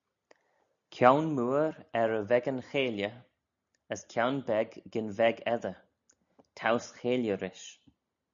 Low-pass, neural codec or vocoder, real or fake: 7.2 kHz; none; real